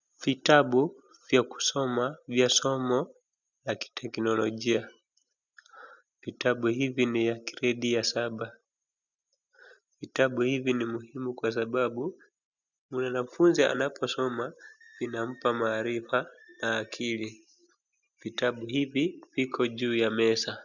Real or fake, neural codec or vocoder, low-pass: real; none; 7.2 kHz